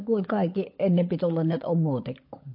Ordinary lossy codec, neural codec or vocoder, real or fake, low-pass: MP3, 32 kbps; codec, 16 kHz, 16 kbps, FunCodec, trained on LibriTTS, 50 frames a second; fake; 5.4 kHz